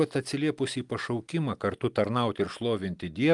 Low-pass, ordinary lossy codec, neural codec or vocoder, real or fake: 10.8 kHz; Opus, 32 kbps; none; real